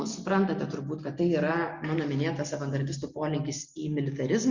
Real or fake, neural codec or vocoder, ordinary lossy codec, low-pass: real; none; Opus, 64 kbps; 7.2 kHz